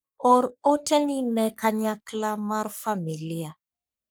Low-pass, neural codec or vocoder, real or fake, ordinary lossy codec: none; codec, 44.1 kHz, 3.4 kbps, Pupu-Codec; fake; none